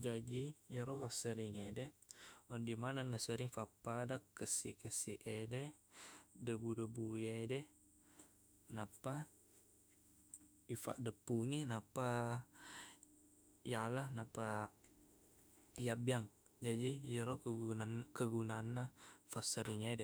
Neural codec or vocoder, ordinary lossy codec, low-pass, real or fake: autoencoder, 48 kHz, 32 numbers a frame, DAC-VAE, trained on Japanese speech; none; none; fake